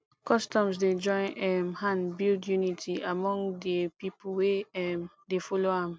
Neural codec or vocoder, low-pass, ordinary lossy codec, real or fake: none; none; none; real